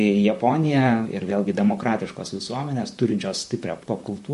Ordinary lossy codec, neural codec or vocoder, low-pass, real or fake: MP3, 48 kbps; vocoder, 44.1 kHz, 128 mel bands, Pupu-Vocoder; 14.4 kHz; fake